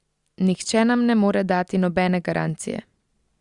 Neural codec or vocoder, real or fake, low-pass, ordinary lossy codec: none; real; 10.8 kHz; Opus, 64 kbps